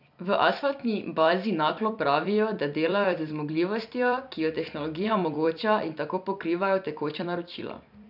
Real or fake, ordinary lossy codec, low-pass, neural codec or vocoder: fake; none; 5.4 kHz; vocoder, 44.1 kHz, 128 mel bands every 256 samples, BigVGAN v2